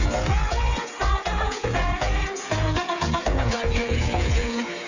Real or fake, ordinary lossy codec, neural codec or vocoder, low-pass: fake; none; codec, 16 kHz, 8 kbps, FreqCodec, smaller model; 7.2 kHz